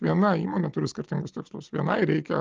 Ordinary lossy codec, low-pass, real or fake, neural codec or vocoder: Opus, 32 kbps; 7.2 kHz; real; none